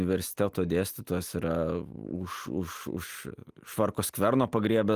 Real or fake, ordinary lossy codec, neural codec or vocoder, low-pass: real; Opus, 32 kbps; none; 14.4 kHz